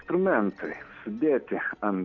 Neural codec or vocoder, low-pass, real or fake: none; 7.2 kHz; real